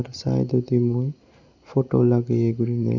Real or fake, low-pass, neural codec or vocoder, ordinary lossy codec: real; 7.2 kHz; none; Opus, 64 kbps